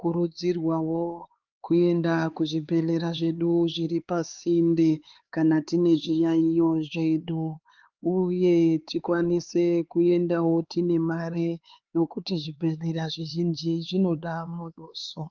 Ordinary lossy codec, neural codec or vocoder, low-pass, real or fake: Opus, 32 kbps; codec, 16 kHz, 4 kbps, X-Codec, HuBERT features, trained on LibriSpeech; 7.2 kHz; fake